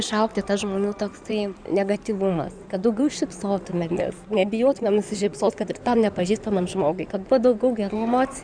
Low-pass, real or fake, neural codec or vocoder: 9.9 kHz; fake; codec, 16 kHz in and 24 kHz out, 2.2 kbps, FireRedTTS-2 codec